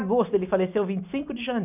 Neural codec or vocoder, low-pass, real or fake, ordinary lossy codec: none; 3.6 kHz; real; none